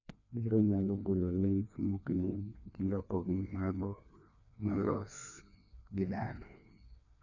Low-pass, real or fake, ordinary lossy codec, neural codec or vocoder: 7.2 kHz; fake; none; codec, 16 kHz, 2 kbps, FreqCodec, larger model